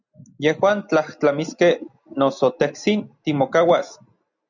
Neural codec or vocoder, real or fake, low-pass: none; real; 7.2 kHz